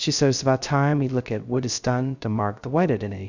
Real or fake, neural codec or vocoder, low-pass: fake; codec, 16 kHz, 0.2 kbps, FocalCodec; 7.2 kHz